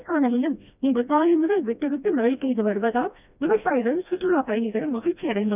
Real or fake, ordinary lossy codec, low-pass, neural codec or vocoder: fake; none; 3.6 kHz; codec, 16 kHz, 1 kbps, FreqCodec, smaller model